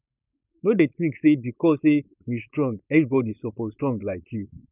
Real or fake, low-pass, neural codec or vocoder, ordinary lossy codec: fake; 3.6 kHz; codec, 16 kHz, 4.8 kbps, FACodec; none